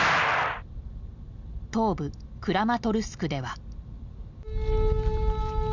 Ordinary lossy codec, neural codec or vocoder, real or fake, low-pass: none; none; real; 7.2 kHz